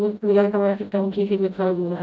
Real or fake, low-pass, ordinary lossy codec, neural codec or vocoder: fake; none; none; codec, 16 kHz, 0.5 kbps, FreqCodec, smaller model